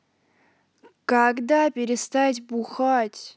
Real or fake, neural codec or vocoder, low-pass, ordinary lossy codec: real; none; none; none